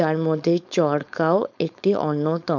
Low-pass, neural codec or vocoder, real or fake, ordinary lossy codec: 7.2 kHz; codec, 16 kHz, 4.8 kbps, FACodec; fake; none